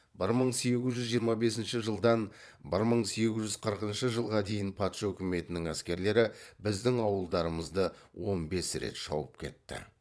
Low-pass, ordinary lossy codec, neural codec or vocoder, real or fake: none; none; vocoder, 22.05 kHz, 80 mel bands, Vocos; fake